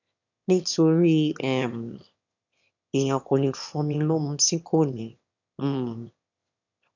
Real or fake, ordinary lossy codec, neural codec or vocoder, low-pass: fake; none; autoencoder, 22.05 kHz, a latent of 192 numbers a frame, VITS, trained on one speaker; 7.2 kHz